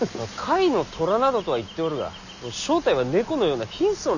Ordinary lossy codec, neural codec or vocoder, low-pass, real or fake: none; none; 7.2 kHz; real